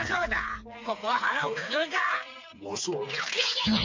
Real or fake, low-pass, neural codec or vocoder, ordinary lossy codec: fake; 7.2 kHz; codec, 16 kHz, 4 kbps, FreqCodec, smaller model; MP3, 48 kbps